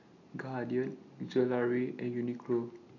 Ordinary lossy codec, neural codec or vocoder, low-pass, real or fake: none; none; 7.2 kHz; real